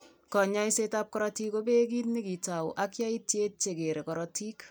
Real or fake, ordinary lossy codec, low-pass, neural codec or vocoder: real; none; none; none